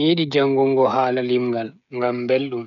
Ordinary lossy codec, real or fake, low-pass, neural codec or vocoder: none; fake; 7.2 kHz; codec, 16 kHz, 16 kbps, FreqCodec, smaller model